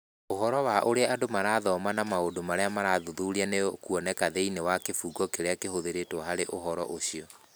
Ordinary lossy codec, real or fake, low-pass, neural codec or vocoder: none; real; none; none